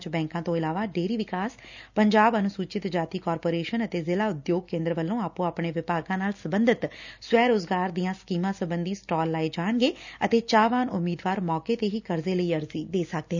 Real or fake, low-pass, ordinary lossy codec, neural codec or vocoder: real; 7.2 kHz; none; none